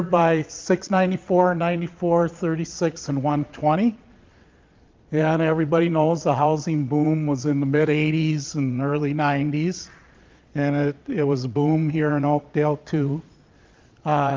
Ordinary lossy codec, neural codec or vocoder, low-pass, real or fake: Opus, 24 kbps; vocoder, 22.05 kHz, 80 mel bands, Vocos; 7.2 kHz; fake